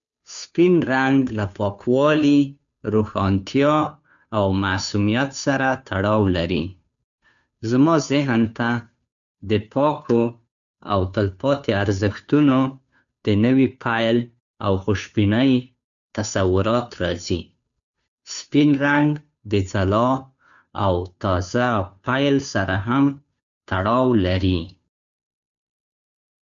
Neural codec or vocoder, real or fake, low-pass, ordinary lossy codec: codec, 16 kHz, 2 kbps, FunCodec, trained on Chinese and English, 25 frames a second; fake; 7.2 kHz; none